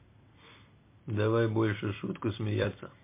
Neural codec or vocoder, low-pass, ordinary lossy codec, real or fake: vocoder, 44.1 kHz, 128 mel bands every 256 samples, BigVGAN v2; 3.6 kHz; MP3, 24 kbps; fake